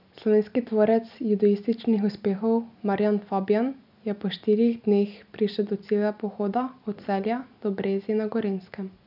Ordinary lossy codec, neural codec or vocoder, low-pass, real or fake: none; none; 5.4 kHz; real